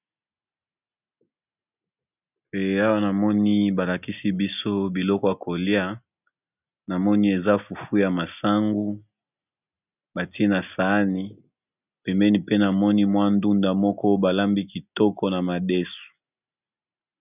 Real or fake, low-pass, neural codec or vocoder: real; 3.6 kHz; none